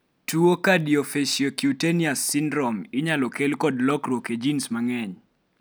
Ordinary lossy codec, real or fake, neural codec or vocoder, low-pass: none; real; none; none